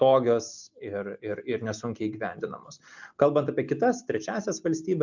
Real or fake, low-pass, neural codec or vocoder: real; 7.2 kHz; none